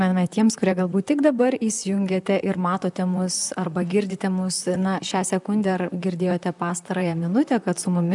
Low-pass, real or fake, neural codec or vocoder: 10.8 kHz; fake; vocoder, 44.1 kHz, 128 mel bands, Pupu-Vocoder